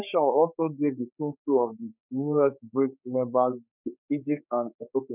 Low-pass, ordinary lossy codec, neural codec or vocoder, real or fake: 3.6 kHz; none; codec, 16 kHz, 4 kbps, X-Codec, HuBERT features, trained on general audio; fake